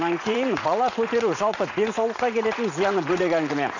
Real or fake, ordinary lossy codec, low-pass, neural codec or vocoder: fake; none; 7.2 kHz; vocoder, 44.1 kHz, 80 mel bands, Vocos